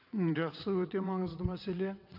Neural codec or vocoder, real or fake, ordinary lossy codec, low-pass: vocoder, 22.05 kHz, 80 mel bands, WaveNeXt; fake; none; 5.4 kHz